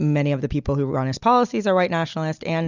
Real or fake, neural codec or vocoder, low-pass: real; none; 7.2 kHz